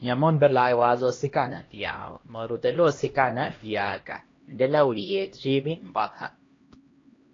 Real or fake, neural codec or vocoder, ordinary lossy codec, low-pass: fake; codec, 16 kHz, 1 kbps, X-Codec, HuBERT features, trained on LibriSpeech; AAC, 32 kbps; 7.2 kHz